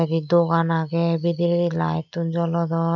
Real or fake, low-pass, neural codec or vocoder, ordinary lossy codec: real; 7.2 kHz; none; none